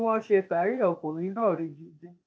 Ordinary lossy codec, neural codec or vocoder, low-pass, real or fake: none; codec, 16 kHz, 0.8 kbps, ZipCodec; none; fake